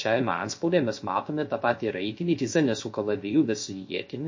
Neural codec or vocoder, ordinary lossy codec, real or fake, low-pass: codec, 16 kHz, 0.3 kbps, FocalCodec; MP3, 32 kbps; fake; 7.2 kHz